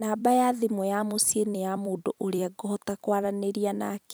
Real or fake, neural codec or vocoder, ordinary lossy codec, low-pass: fake; vocoder, 44.1 kHz, 128 mel bands every 256 samples, BigVGAN v2; none; none